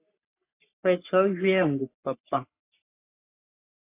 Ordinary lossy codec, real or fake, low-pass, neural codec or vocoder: AAC, 24 kbps; fake; 3.6 kHz; codec, 44.1 kHz, 3.4 kbps, Pupu-Codec